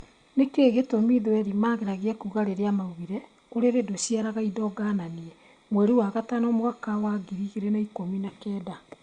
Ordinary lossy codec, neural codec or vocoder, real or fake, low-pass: Opus, 64 kbps; none; real; 9.9 kHz